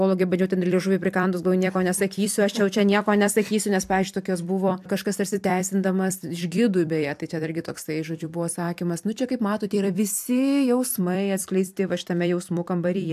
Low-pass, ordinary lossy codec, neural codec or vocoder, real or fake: 14.4 kHz; AAC, 96 kbps; vocoder, 44.1 kHz, 128 mel bands every 256 samples, BigVGAN v2; fake